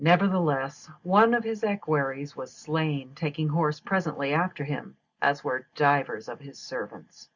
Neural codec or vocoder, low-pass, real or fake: none; 7.2 kHz; real